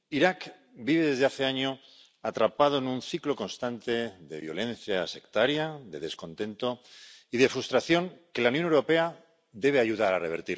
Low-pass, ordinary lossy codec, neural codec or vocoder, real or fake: none; none; none; real